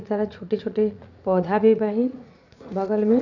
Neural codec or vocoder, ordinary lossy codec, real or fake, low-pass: vocoder, 44.1 kHz, 80 mel bands, Vocos; none; fake; 7.2 kHz